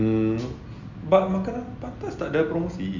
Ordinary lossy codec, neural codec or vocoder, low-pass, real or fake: none; none; 7.2 kHz; real